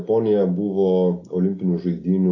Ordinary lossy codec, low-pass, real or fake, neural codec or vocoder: AAC, 32 kbps; 7.2 kHz; real; none